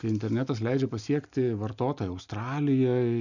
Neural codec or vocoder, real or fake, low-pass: none; real; 7.2 kHz